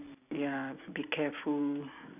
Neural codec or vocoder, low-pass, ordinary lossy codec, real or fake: none; 3.6 kHz; none; real